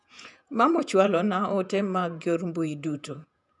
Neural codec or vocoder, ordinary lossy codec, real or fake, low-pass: vocoder, 48 kHz, 128 mel bands, Vocos; none; fake; 10.8 kHz